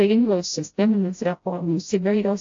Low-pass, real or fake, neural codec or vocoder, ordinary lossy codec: 7.2 kHz; fake; codec, 16 kHz, 0.5 kbps, FreqCodec, smaller model; AAC, 48 kbps